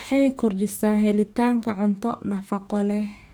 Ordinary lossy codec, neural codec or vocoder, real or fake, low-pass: none; codec, 44.1 kHz, 2.6 kbps, DAC; fake; none